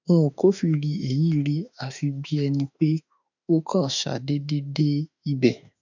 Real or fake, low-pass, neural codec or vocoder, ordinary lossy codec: fake; 7.2 kHz; autoencoder, 48 kHz, 32 numbers a frame, DAC-VAE, trained on Japanese speech; none